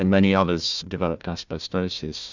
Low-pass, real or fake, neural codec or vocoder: 7.2 kHz; fake; codec, 16 kHz, 1 kbps, FunCodec, trained on Chinese and English, 50 frames a second